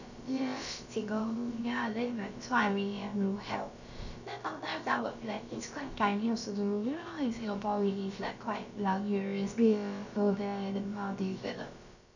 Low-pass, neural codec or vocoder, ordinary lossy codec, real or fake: 7.2 kHz; codec, 16 kHz, about 1 kbps, DyCAST, with the encoder's durations; none; fake